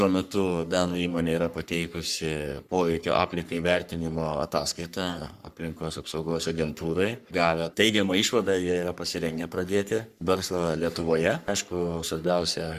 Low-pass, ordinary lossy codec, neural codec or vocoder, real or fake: 14.4 kHz; Opus, 64 kbps; codec, 44.1 kHz, 3.4 kbps, Pupu-Codec; fake